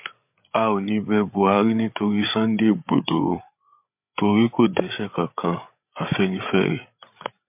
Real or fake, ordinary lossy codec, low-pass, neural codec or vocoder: fake; MP3, 32 kbps; 3.6 kHz; codec, 16 kHz, 8 kbps, FreqCodec, larger model